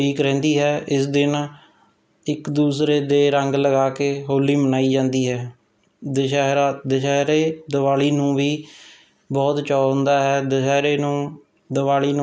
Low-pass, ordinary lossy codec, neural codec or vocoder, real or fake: none; none; none; real